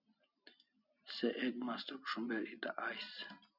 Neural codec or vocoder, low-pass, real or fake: none; 5.4 kHz; real